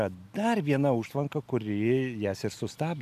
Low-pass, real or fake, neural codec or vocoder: 14.4 kHz; real; none